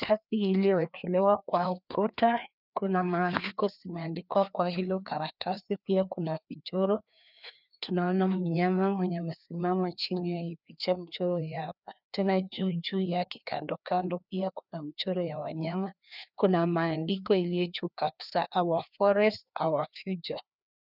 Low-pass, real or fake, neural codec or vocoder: 5.4 kHz; fake; codec, 16 kHz, 2 kbps, FreqCodec, larger model